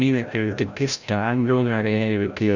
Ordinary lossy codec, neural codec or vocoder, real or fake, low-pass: none; codec, 16 kHz, 0.5 kbps, FreqCodec, larger model; fake; 7.2 kHz